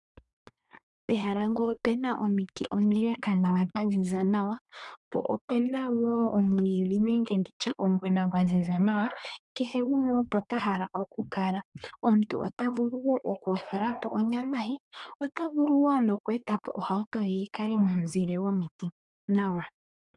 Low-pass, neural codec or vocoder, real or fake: 10.8 kHz; codec, 24 kHz, 1 kbps, SNAC; fake